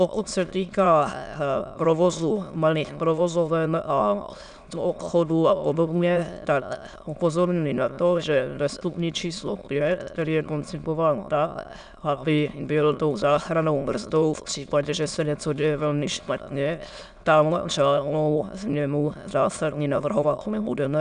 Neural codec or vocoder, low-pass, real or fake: autoencoder, 22.05 kHz, a latent of 192 numbers a frame, VITS, trained on many speakers; 9.9 kHz; fake